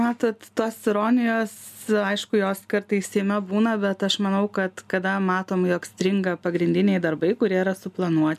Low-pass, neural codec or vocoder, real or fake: 14.4 kHz; none; real